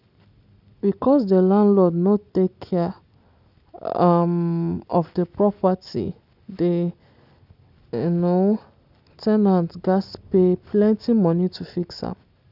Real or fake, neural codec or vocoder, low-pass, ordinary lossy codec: real; none; 5.4 kHz; none